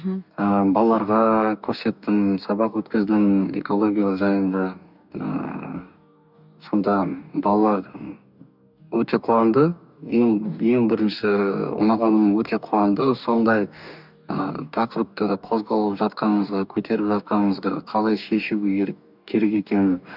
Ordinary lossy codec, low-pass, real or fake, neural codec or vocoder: none; 5.4 kHz; fake; codec, 44.1 kHz, 2.6 kbps, DAC